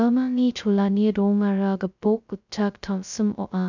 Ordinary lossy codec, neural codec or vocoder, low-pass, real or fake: none; codec, 16 kHz, 0.2 kbps, FocalCodec; 7.2 kHz; fake